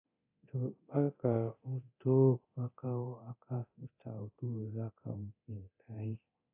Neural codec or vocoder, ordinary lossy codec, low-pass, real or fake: codec, 24 kHz, 0.9 kbps, DualCodec; AAC, 32 kbps; 3.6 kHz; fake